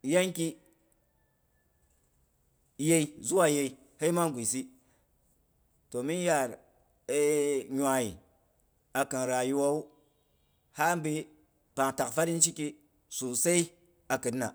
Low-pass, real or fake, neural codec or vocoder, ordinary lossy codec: none; real; none; none